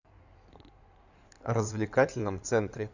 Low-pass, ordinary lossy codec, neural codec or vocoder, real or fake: 7.2 kHz; none; codec, 16 kHz in and 24 kHz out, 2.2 kbps, FireRedTTS-2 codec; fake